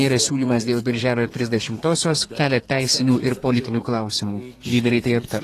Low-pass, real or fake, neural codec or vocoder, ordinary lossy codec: 14.4 kHz; fake; codec, 32 kHz, 1.9 kbps, SNAC; AAC, 48 kbps